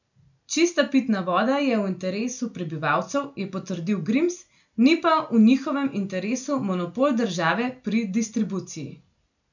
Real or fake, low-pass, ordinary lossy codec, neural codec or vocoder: real; 7.2 kHz; none; none